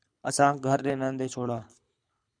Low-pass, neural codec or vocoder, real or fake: 9.9 kHz; codec, 24 kHz, 6 kbps, HILCodec; fake